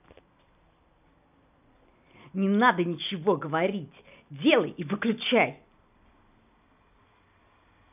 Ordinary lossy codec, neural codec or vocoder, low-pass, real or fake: none; none; 3.6 kHz; real